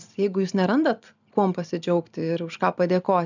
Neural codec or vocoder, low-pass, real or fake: none; 7.2 kHz; real